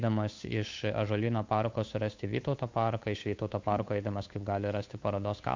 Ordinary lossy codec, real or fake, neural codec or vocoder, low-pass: MP3, 64 kbps; fake; codec, 16 kHz, 8 kbps, FunCodec, trained on Chinese and English, 25 frames a second; 7.2 kHz